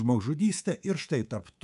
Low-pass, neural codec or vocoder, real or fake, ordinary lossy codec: 10.8 kHz; codec, 24 kHz, 3.1 kbps, DualCodec; fake; MP3, 96 kbps